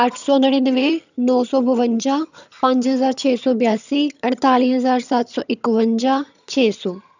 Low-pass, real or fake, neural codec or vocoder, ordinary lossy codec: 7.2 kHz; fake; vocoder, 22.05 kHz, 80 mel bands, HiFi-GAN; none